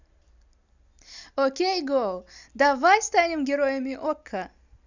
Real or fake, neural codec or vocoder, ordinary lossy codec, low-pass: real; none; none; 7.2 kHz